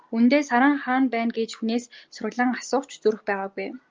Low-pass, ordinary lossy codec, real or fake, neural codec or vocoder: 7.2 kHz; Opus, 24 kbps; real; none